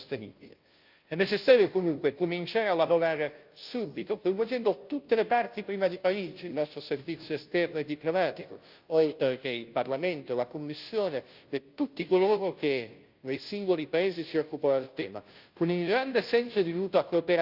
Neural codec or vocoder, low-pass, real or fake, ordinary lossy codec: codec, 16 kHz, 0.5 kbps, FunCodec, trained on Chinese and English, 25 frames a second; 5.4 kHz; fake; Opus, 24 kbps